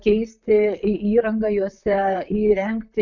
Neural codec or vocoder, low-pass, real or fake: vocoder, 44.1 kHz, 128 mel bands, Pupu-Vocoder; 7.2 kHz; fake